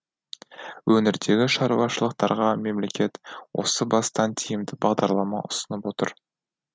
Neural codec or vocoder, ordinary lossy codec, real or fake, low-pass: none; none; real; none